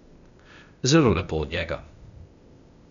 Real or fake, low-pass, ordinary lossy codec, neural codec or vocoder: fake; 7.2 kHz; none; codec, 16 kHz, 0.8 kbps, ZipCodec